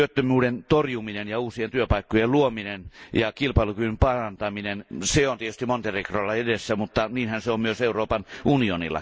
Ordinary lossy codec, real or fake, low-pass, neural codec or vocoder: none; real; none; none